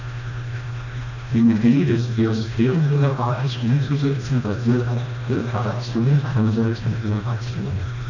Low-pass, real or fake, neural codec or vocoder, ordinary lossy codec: 7.2 kHz; fake; codec, 16 kHz, 1 kbps, FreqCodec, smaller model; none